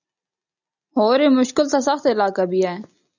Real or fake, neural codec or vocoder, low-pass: real; none; 7.2 kHz